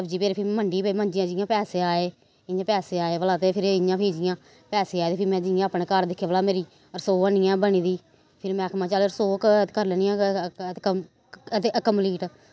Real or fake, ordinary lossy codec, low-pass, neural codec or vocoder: real; none; none; none